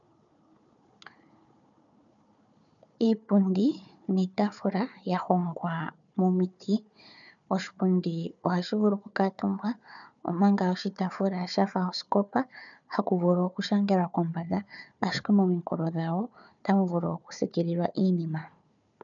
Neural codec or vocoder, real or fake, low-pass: codec, 16 kHz, 4 kbps, FunCodec, trained on Chinese and English, 50 frames a second; fake; 7.2 kHz